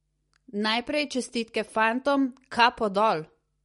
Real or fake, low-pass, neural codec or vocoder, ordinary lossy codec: real; 14.4 kHz; none; MP3, 48 kbps